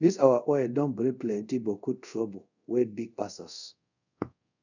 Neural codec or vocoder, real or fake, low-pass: codec, 24 kHz, 0.5 kbps, DualCodec; fake; 7.2 kHz